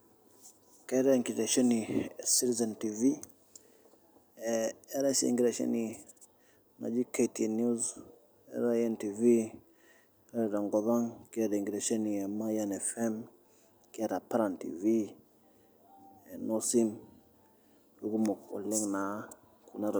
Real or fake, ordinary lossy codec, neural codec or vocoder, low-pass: real; none; none; none